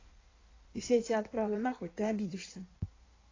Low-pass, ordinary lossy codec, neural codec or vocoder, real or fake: 7.2 kHz; AAC, 32 kbps; codec, 16 kHz in and 24 kHz out, 1.1 kbps, FireRedTTS-2 codec; fake